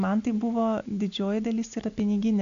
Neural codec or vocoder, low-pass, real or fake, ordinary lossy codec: none; 7.2 kHz; real; AAC, 96 kbps